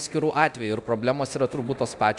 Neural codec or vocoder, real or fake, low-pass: codec, 24 kHz, 0.9 kbps, DualCodec; fake; 10.8 kHz